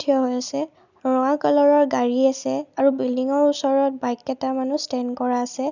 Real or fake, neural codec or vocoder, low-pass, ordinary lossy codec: real; none; 7.2 kHz; none